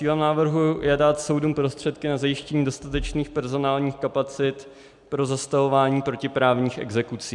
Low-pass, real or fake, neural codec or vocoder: 10.8 kHz; real; none